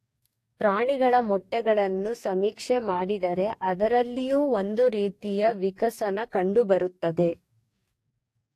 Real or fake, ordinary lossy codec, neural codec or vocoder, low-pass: fake; AAC, 64 kbps; codec, 44.1 kHz, 2.6 kbps, DAC; 14.4 kHz